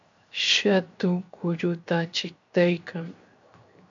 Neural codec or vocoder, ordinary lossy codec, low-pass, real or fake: codec, 16 kHz, 0.7 kbps, FocalCodec; MP3, 64 kbps; 7.2 kHz; fake